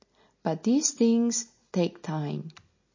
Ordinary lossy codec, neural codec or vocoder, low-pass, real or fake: MP3, 32 kbps; none; 7.2 kHz; real